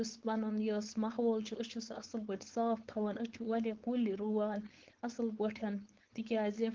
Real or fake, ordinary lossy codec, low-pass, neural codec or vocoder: fake; Opus, 16 kbps; 7.2 kHz; codec, 16 kHz, 4.8 kbps, FACodec